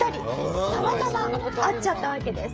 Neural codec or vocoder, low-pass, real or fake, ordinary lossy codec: codec, 16 kHz, 16 kbps, FreqCodec, smaller model; none; fake; none